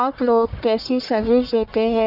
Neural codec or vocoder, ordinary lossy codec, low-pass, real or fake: codec, 44.1 kHz, 1.7 kbps, Pupu-Codec; none; 5.4 kHz; fake